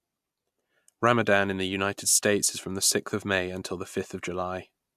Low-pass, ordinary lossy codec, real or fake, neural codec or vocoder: 14.4 kHz; MP3, 96 kbps; real; none